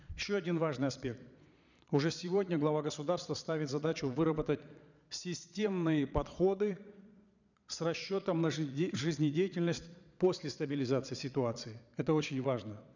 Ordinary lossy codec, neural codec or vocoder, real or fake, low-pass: none; vocoder, 22.05 kHz, 80 mel bands, Vocos; fake; 7.2 kHz